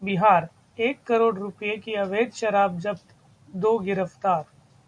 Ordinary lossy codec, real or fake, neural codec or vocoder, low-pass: Opus, 64 kbps; real; none; 9.9 kHz